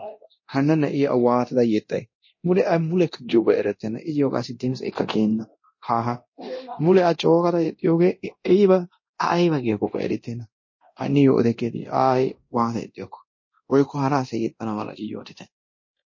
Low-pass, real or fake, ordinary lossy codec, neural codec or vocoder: 7.2 kHz; fake; MP3, 32 kbps; codec, 24 kHz, 0.9 kbps, DualCodec